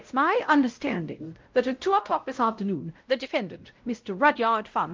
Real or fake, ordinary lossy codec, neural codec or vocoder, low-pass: fake; Opus, 24 kbps; codec, 16 kHz, 0.5 kbps, X-Codec, WavLM features, trained on Multilingual LibriSpeech; 7.2 kHz